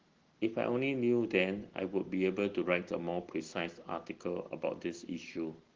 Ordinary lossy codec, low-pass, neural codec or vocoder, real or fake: Opus, 16 kbps; 7.2 kHz; none; real